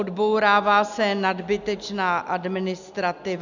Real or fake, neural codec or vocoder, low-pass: real; none; 7.2 kHz